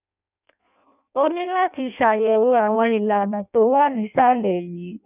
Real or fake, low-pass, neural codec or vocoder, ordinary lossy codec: fake; 3.6 kHz; codec, 16 kHz in and 24 kHz out, 0.6 kbps, FireRedTTS-2 codec; none